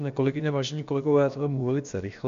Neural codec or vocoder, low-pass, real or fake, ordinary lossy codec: codec, 16 kHz, about 1 kbps, DyCAST, with the encoder's durations; 7.2 kHz; fake; MP3, 48 kbps